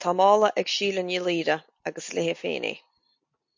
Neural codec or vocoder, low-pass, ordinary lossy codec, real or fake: none; 7.2 kHz; MP3, 48 kbps; real